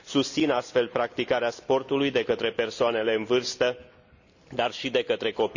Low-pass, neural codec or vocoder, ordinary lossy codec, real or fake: 7.2 kHz; none; none; real